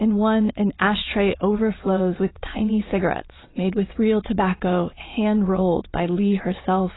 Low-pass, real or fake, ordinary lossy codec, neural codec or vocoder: 7.2 kHz; fake; AAC, 16 kbps; vocoder, 22.05 kHz, 80 mel bands, Vocos